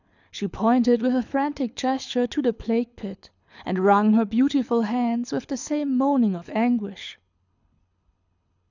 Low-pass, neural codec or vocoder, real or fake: 7.2 kHz; codec, 24 kHz, 6 kbps, HILCodec; fake